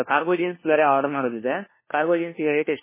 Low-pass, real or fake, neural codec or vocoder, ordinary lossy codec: 3.6 kHz; fake; codec, 16 kHz, 1 kbps, FunCodec, trained on LibriTTS, 50 frames a second; MP3, 16 kbps